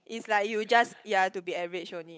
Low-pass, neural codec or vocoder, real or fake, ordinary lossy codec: none; codec, 16 kHz, 8 kbps, FunCodec, trained on Chinese and English, 25 frames a second; fake; none